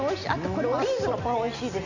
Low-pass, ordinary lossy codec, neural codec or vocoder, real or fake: 7.2 kHz; none; none; real